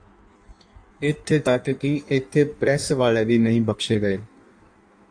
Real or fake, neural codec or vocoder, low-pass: fake; codec, 16 kHz in and 24 kHz out, 1.1 kbps, FireRedTTS-2 codec; 9.9 kHz